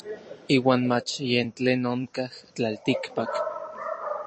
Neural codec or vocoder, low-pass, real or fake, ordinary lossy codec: vocoder, 44.1 kHz, 128 mel bands every 256 samples, BigVGAN v2; 9.9 kHz; fake; MP3, 32 kbps